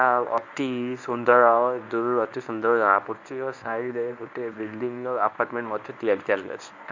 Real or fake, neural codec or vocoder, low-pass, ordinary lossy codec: fake; codec, 24 kHz, 0.9 kbps, WavTokenizer, medium speech release version 1; 7.2 kHz; none